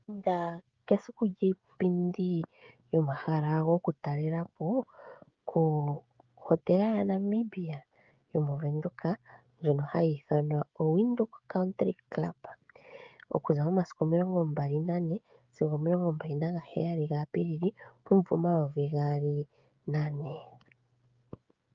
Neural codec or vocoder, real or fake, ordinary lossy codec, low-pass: codec, 16 kHz, 16 kbps, FreqCodec, smaller model; fake; Opus, 24 kbps; 7.2 kHz